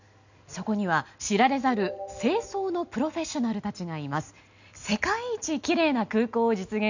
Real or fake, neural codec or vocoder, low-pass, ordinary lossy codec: real; none; 7.2 kHz; none